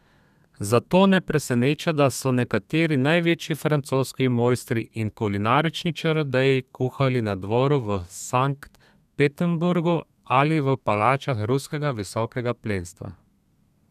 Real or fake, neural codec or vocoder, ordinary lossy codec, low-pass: fake; codec, 32 kHz, 1.9 kbps, SNAC; none; 14.4 kHz